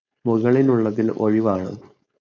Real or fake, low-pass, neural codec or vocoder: fake; 7.2 kHz; codec, 16 kHz, 4.8 kbps, FACodec